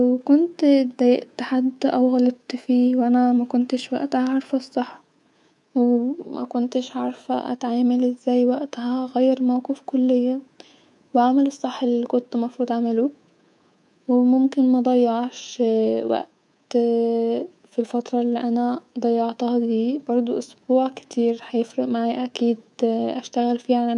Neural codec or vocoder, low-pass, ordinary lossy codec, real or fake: codec, 24 kHz, 3.1 kbps, DualCodec; 10.8 kHz; none; fake